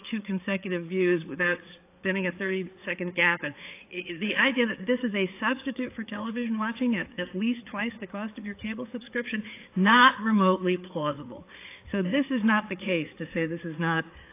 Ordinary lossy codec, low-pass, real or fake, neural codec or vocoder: AAC, 24 kbps; 3.6 kHz; fake; codec, 16 kHz, 4 kbps, FreqCodec, larger model